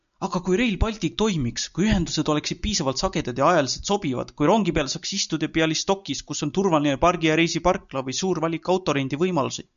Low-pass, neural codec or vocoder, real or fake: 7.2 kHz; none; real